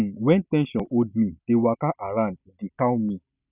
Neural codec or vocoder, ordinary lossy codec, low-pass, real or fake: none; none; 3.6 kHz; real